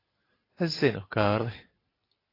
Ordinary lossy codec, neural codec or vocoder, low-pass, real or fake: AAC, 24 kbps; none; 5.4 kHz; real